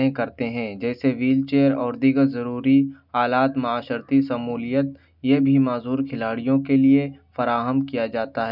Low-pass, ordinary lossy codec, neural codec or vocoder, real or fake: 5.4 kHz; none; none; real